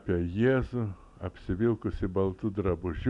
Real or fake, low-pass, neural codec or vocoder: real; 10.8 kHz; none